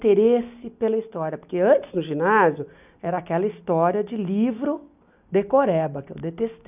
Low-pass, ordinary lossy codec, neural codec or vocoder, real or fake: 3.6 kHz; none; none; real